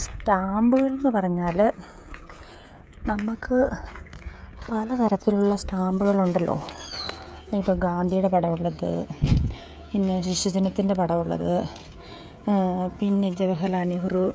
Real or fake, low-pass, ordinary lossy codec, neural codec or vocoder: fake; none; none; codec, 16 kHz, 16 kbps, FreqCodec, smaller model